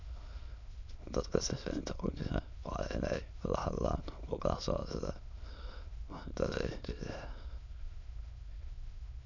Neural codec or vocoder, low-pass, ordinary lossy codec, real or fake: autoencoder, 22.05 kHz, a latent of 192 numbers a frame, VITS, trained on many speakers; 7.2 kHz; none; fake